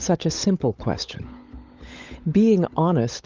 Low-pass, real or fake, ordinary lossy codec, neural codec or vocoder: 7.2 kHz; fake; Opus, 32 kbps; codec, 16 kHz, 16 kbps, FunCodec, trained on LibriTTS, 50 frames a second